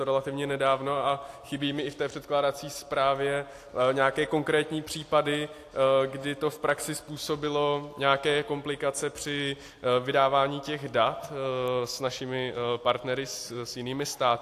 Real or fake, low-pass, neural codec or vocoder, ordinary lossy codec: real; 14.4 kHz; none; AAC, 64 kbps